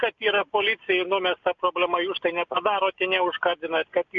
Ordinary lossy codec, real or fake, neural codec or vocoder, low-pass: MP3, 64 kbps; real; none; 7.2 kHz